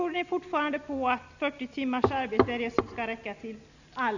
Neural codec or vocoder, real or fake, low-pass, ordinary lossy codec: none; real; 7.2 kHz; none